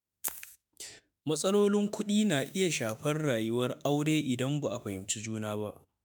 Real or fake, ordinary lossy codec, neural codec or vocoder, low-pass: fake; none; autoencoder, 48 kHz, 32 numbers a frame, DAC-VAE, trained on Japanese speech; none